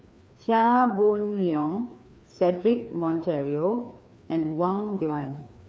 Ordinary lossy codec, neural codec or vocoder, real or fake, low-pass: none; codec, 16 kHz, 2 kbps, FreqCodec, larger model; fake; none